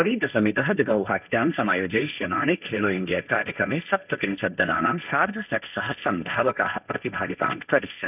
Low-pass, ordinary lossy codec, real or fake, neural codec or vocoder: 3.6 kHz; none; fake; codec, 16 kHz, 1.1 kbps, Voila-Tokenizer